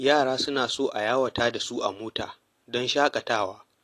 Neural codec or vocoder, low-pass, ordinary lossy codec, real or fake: none; 14.4 kHz; AAC, 48 kbps; real